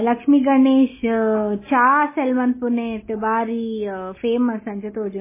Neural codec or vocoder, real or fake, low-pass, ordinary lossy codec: none; real; 3.6 kHz; MP3, 16 kbps